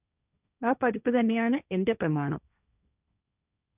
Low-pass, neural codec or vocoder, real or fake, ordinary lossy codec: 3.6 kHz; codec, 16 kHz, 1.1 kbps, Voila-Tokenizer; fake; none